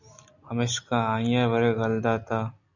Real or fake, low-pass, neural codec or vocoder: real; 7.2 kHz; none